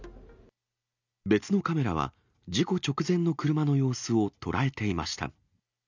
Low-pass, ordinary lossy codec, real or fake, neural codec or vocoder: 7.2 kHz; none; real; none